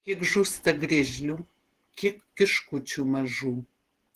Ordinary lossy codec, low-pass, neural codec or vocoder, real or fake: Opus, 16 kbps; 14.4 kHz; vocoder, 48 kHz, 128 mel bands, Vocos; fake